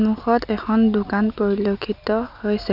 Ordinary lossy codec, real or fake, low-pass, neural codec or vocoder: none; real; 5.4 kHz; none